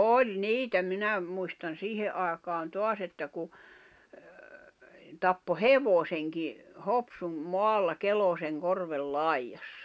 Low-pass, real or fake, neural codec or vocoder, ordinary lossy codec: none; real; none; none